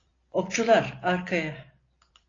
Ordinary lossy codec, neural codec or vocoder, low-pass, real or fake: AAC, 32 kbps; none; 7.2 kHz; real